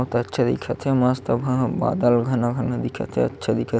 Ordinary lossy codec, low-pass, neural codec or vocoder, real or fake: none; none; none; real